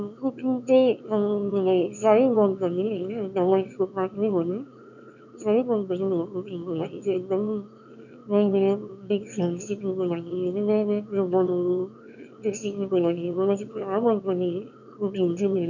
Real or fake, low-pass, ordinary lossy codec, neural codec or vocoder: fake; 7.2 kHz; none; autoencoder, 22.05 kHz, a latent of 192 numbers a frame, VITS, trained on one speaker